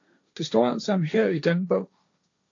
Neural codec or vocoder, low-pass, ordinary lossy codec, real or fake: codec, 16 kHz, 1.1 kbps, Voila-Tokenizer; 7.2 kHz; AAC, 48 kbps; fake